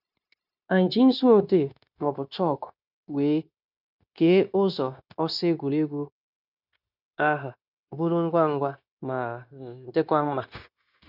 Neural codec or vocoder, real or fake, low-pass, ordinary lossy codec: codec, 16 kHz, 0.9 kbps, LongCat-Audio-Codec; fake; 5.4 kHz; none